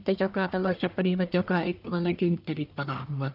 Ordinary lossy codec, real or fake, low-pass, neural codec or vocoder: none; fake; 5.4 kHz; codec, 44.1 kHz, 1.7 kbps, Pupu-Codec